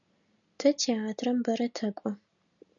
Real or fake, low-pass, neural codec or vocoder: real; 7.2 kHz; none